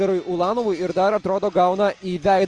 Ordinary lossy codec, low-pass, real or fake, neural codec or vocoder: Opus, 32 kbps; 10.8 kHz; real; none